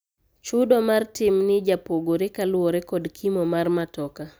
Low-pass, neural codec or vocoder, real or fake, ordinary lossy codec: none; none; real; none